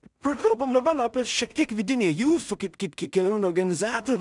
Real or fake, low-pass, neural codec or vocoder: fake; 10.8 kHz; codec, 16 kHz in and 24 kHz out, 0.4 kbps, LongCat-Audio-Codec, two codebook decoder